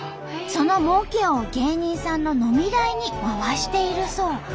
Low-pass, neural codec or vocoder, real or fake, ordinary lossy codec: none; none; real; none